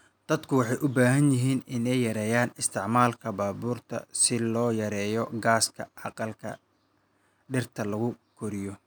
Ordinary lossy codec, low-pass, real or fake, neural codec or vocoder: none; none; real; none